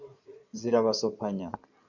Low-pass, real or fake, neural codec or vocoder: 7.2 kHz; fake; codec, 16 kHz in and 24 kHz out, 2.2 kbps, FireRedTTS-2 codec